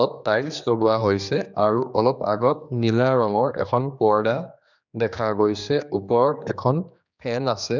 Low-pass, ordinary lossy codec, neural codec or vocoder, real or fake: 7.2 kHz; none; codec, 16 kHz, 2 kbps, X-Codec, HuBERT features, trained on general audio; fake